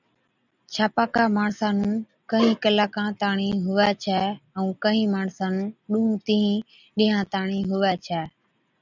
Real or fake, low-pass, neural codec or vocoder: real; 7.2 kHz; none